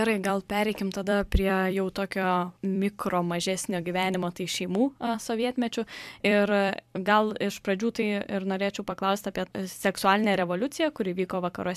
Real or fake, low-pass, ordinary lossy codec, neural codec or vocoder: fake; 14.4 kHz; AAC, 96 kbps; vocoder, 44.1 kHz, 128 mel bands every 256 samples, BigVGAN v2